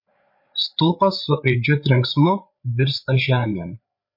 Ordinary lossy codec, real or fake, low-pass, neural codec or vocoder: MP3, 32 kbps; fake; 5.4 kHz; codec, 16 kHz, 8 kbps, FreqCodec, larger model